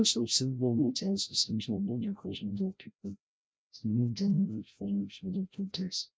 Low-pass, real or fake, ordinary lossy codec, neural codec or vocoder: none; fake; none; codec, 16 kHz, 0.5 kbps, FreqCodec, larger model